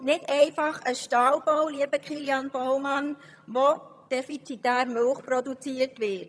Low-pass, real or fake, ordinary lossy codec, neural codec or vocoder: none; fake; none; vocoder, 22.05 kHz, 80 mel bands, HiFi-GAN